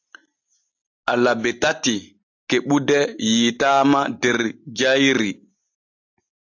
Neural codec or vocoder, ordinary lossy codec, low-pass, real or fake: none; AAC, 48 kbps; 7.2 kHz; real